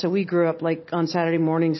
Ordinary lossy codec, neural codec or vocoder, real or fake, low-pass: MP3, 24 kbps; none; real; 7.2 kHz